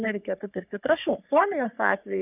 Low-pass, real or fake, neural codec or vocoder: 3.6 kHz; fake; codec, 44.1 kHz, 3.4 kbps, Pupu-Codec